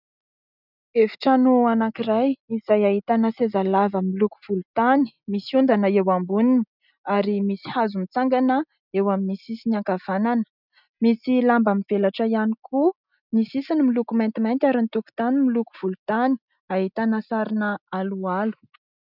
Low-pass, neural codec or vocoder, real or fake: 5.4 kHz; none; real